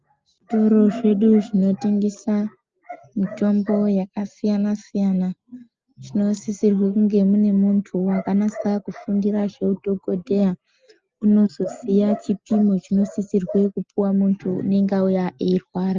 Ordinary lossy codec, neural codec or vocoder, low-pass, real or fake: Opus, 24 kbps; none; 7.2 kHz; real